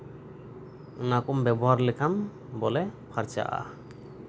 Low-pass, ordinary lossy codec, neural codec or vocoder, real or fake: none; none; none; real